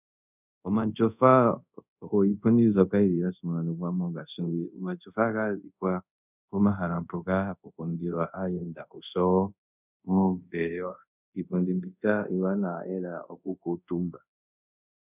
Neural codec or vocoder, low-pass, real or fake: codec, 24 kHz, 0.5 kbps, DualCodec; 3.6 kHz; fake